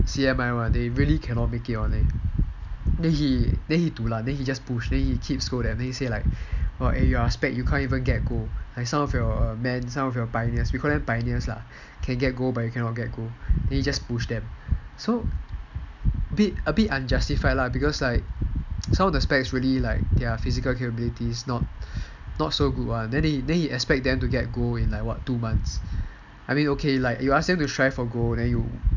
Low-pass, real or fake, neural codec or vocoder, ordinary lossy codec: 7.2 kHz; real; none; none